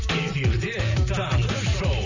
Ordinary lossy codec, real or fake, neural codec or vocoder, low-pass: none; fake; vocoder, 44.1 kHz, 128 mel bands every 256 samples, BigVGAN v2; 7.2 kHz